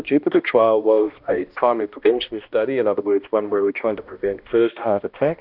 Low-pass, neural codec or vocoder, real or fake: 5.4 kHz; codec, 16 kHz, 1 kbps, X-Codec, HuBERT features, trained on balanced general audio; fake